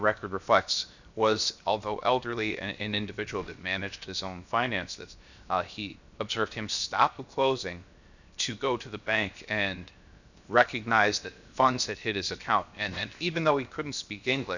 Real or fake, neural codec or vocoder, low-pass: fake; codec, 16 kHz, 0.7 kbps, FocalCodec; 7.2 kHz